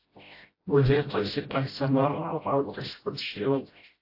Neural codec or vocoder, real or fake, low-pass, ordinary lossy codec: codec, 16 kHz, 0.5 kbps, FreqCodec, smaller model; fake; 5.4 kHz; AAC, 32 kbps